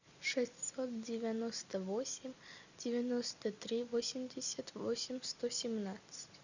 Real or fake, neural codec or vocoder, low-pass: real; none; 7.2 kHz